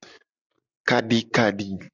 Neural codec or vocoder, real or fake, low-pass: none; real; 7.2 kHz